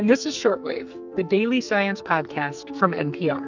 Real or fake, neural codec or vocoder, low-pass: fake; codec, 44.1 kHz, 2.6 kbps, SNAC; 7.2 kHz